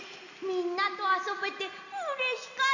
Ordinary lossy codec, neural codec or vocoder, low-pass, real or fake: Opus, 64 kbps; none; 7.2 kHz; real